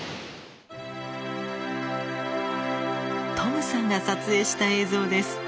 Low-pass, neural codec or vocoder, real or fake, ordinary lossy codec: none; none; real; none